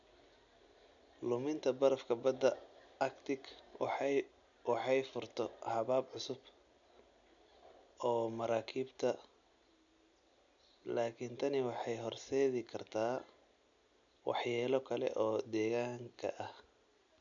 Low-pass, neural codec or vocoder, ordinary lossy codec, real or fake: 7.2 kHz; none; none; real